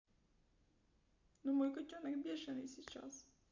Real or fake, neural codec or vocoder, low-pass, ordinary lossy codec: real; none; 7.2 kHz; MP3, 48 kbps